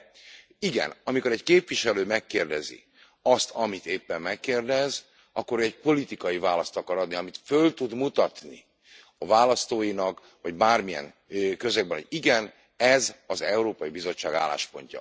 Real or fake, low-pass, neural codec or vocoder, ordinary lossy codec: real; none; none; none